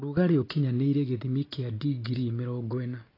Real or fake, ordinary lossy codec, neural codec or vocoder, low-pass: real; AAC, 24 kbps; none; 5.4 kHz